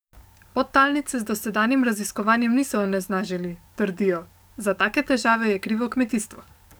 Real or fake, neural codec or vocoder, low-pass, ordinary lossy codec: fake; codec, 44.1 kHz, 7.8 kbps, DAC; none; none